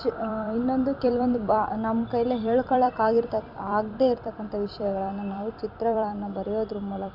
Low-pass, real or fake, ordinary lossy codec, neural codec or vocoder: 5.4 kHz; fake; none; vocoder, 44.1 kHz, 128 mel bands every 256 samples, BigVGAN v2